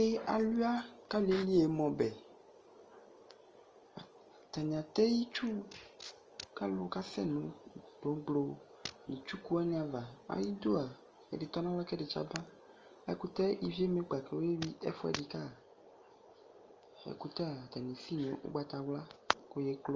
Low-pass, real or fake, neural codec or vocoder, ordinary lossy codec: 7.2 kHz; real; none; Opus, 24 kbps